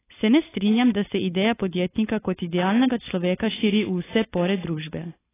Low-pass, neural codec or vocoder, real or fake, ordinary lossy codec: 3.6 kHz; codec, 16 kHz, 4.8 kbps, FACodec; fake; AAC, 16 kbps